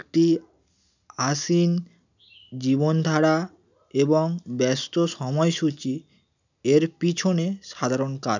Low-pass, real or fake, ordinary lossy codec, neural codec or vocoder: 7.2 kHz; real; none; none